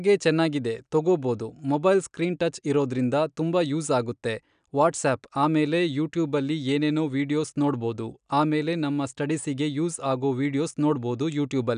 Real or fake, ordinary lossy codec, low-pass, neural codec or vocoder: real; none; 9.9 kHz; none